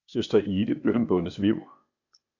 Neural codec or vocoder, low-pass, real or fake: codec, 16 kHz, 0.8 kbps, ZipCodec; 7.2 kHz; fake